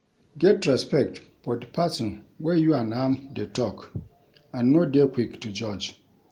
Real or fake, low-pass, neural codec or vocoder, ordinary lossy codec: real; 19.8 kHz; none; Opus, 24 kbps